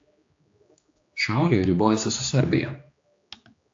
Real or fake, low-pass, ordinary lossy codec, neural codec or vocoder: fake; 7.2 kHz; AAC, 48 kbps; codec, 16 kHz, 2 kbps, X-Codec, HuBERT features, trained on general audio